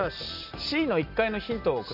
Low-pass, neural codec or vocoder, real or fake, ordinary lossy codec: 5.4 kHz; none; real; none